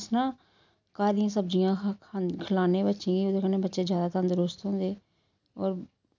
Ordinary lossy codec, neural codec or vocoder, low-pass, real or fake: none; none; 7.2 kHz; real